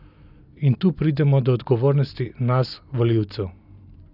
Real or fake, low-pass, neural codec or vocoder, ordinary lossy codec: real; 5.4 kHz; none; none